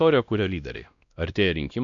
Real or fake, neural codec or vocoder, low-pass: fake; codec, 16 kHz, 1 kbps, X-Codec, WavLM features, trained on Multilingual LibriSpeech; 7.2 kHz